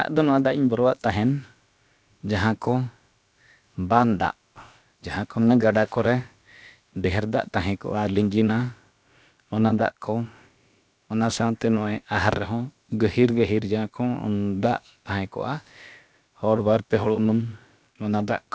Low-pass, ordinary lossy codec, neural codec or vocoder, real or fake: none; none; codec, 16 kHz, about 1 kbps, DyCAST, with the encoder's durations; fake